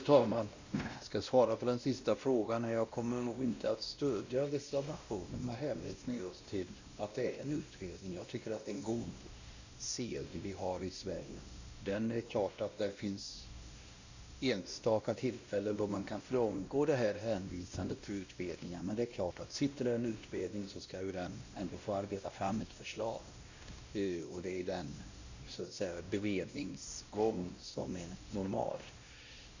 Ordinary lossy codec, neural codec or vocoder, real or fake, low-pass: Opus, 64 kbps; codec, 16 kHz, 1 kbps, X-Codec, WavLM features, trained on Multilingual LibriSpeech; fake; 7.2 kHz